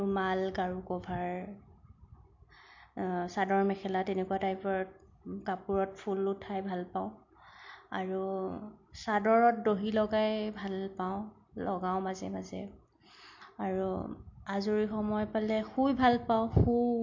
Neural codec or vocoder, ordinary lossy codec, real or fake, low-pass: none; MP3, 48 kbps; real; 7.2 kHz